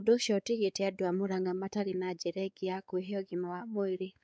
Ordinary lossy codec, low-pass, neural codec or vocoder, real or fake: none; none; codec, 16 kHz, 4 kbps, X-Codec, WavLM features, trained on Multilingual LibriSpeech; fake